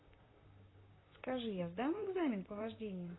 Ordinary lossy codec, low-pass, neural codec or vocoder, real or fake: AAC, 16 kbps; 7.2 kHz; vocoder, 44.1 kHz, 128 mel bands, Pupu-Vocoder; fake